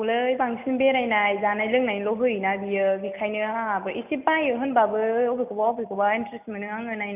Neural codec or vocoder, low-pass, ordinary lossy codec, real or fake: none; 3.6 kHz; none; real